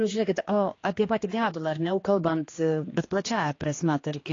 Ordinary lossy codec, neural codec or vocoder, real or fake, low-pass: AAC, 32 kbps; codec, 16 kHz, 2 kbps, X-Codec, HuBERT features, trained on general audio; fake; 7.2 kHz